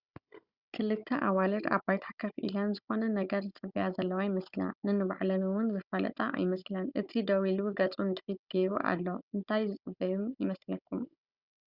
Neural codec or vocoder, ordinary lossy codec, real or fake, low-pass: codec, 16 kHz, 16 kbps, FunCodec, trained on Chinese and English, 50 frames a second; Opus, 64 kbps; fake; 5.4 kHz